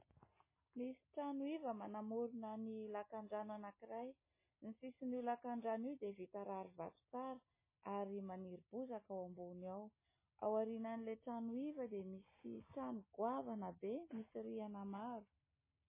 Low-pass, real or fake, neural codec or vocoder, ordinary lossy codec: 3.6 kHz; real; none; MP3, 16 kbps